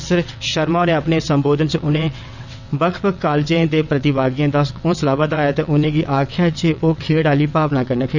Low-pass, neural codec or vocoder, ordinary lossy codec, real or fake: 7.2 kHz; vocoder, 22.05 kHz, 80 mel bands, WaveNeXt; none; fake